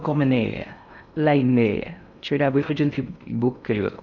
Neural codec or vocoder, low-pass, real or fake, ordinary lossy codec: codec, 16 kHz in and 24 kHz out, 0.6 kbps, FocalCodec, streaming, 4096 codes; 7.2 kHz; fake; none